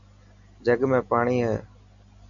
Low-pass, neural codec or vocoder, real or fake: 7.2 kHz; none; real